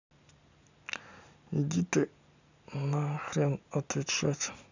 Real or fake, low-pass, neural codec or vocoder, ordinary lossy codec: real; 7.2 kHz; none; none